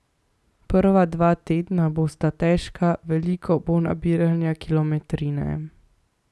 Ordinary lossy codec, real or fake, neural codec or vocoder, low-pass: none; real; none; none